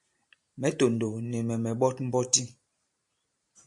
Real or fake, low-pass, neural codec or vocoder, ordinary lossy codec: fake; 10.8 kHz; vocoder, 44.1 kHz, 128 mel bands every 512 samples, BigVGAN v2; MP3, 64 kbps